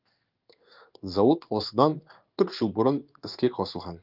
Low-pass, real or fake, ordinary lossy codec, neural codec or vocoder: 5.4 kHz; fake; Opus, 24 kbps; codec, 16 kHz in and 24 kHz out, 1 kbps, XY-Tokenizer